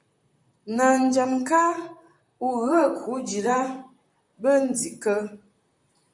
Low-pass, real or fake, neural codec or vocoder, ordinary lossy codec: 10.8 kHz; fake; vocoder, 44.1 kHz, 128 mel bands, Pupu-Vocoder; MP3, 64 kbps